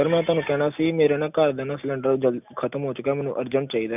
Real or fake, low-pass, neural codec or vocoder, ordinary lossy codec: real; 3.6 kHz; none; none